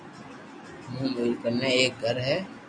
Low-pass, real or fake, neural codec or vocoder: 9.9 kHz; real; none